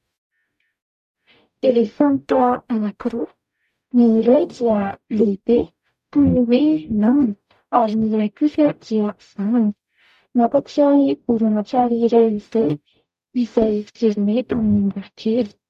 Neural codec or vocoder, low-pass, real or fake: codec, 44.1 kHz, 0.9 kbps, DAC; 14.4 kHz; fake